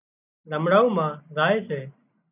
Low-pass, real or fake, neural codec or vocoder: 3.6 kHz; real; none